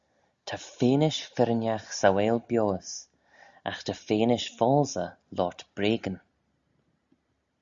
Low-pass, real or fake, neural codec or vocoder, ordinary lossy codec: 7.2 kHz; real; none; Opus, 64 kbps